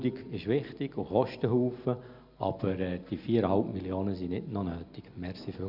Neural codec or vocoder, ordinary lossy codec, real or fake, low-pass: none; none; real; 5.4 kHz